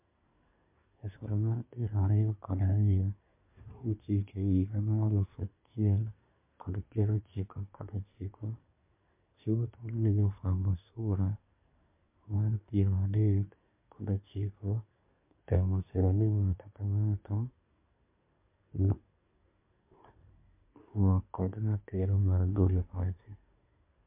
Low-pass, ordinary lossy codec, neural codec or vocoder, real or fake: 3.6 kHz; MP3, 32 kbps; codec, 24 kHz, 1 kbps, SNAC; fake